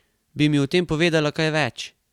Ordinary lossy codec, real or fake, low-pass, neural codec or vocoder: Opus, 64 kbps; real; 19.8 kHz; none